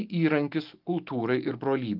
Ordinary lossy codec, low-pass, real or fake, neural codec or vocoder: Opus, 32 kbps; 5.4 kHz; real; none